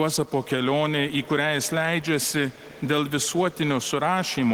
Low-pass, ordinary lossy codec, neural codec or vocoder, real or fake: 19.8 kHz; Opus, 16 kbps; none; real